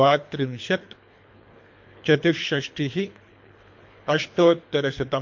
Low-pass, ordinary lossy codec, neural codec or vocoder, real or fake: 7.2 kHz; MP3, 48 kbps; codec, 24 kHz, 3 kbps, HILCodec; fake